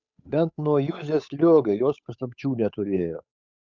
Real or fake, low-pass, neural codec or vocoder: fake; 7.2 kHz; codec, 16 kHz, 8 kbps, FunCodec, trained on Chinese and English, 25 frames a second